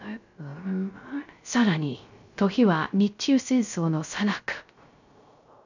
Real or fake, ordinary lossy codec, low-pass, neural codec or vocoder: fake; none; 7.2 kHz; codec, 16 kHz, 0.3 kbps, FocalCodec